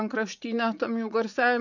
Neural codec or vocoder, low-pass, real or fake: vocoder, 44.1 kHz, 80 mel bands, Vocos; 7.2 kHz; fake